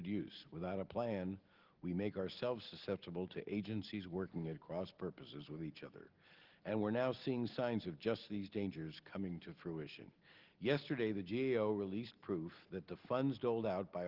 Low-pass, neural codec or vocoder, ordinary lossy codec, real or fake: 5.4 kHz; none; Opus, 16 kbps; real